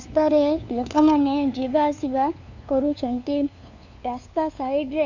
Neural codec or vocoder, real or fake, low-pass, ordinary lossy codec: codec, 16 kHz, 2 kbps, FunCodec, trained on LibriTTS, 25 frames a second; fake; 7.2 kHz; none